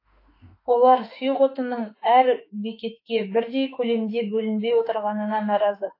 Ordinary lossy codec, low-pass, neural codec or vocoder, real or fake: AAC, 32 kbps; 5.4 kHz; autoencoder, 48 kHz, 32 numbers a frame, DAC-VAE, trained on Japanese speech; fake